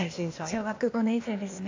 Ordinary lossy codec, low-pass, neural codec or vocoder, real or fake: AAC, 48 kbps; 7.2 kHz; codec, 16 kHz, 0.8 kbps, ZipCodec; fake